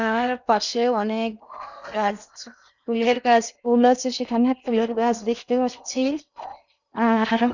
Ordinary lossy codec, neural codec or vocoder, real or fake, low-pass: none; codec, 16 kHz in and 24 kHz out, 0.8 kbps, FocalCodec, streaming, 65536 codes; fake; 7.2 kHz